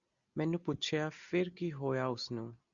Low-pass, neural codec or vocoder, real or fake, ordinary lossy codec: 7.2 kHz; none; real; Opus, 64 kbps